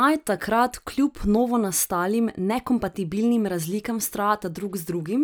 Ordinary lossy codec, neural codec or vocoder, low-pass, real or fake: none; none; none; real